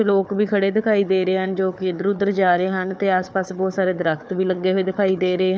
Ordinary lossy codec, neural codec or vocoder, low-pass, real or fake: none; codec, 16 kHz, 4 kbps, FunCodec, trained on Chinese and English, 50 frames a second; none; fake